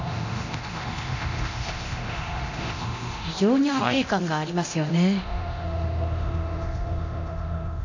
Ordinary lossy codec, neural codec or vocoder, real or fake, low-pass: none; codec, 24 kHz, 0.9 kbps, DualCodec; fake; 7.2 kHz